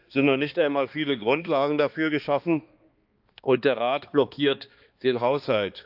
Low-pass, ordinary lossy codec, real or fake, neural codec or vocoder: 5.4 kHz; Opus, 24 kbps; fake; codec, 16 kHz, 2 kbps, X-Codec, HuBERT features, trained on balanced general audio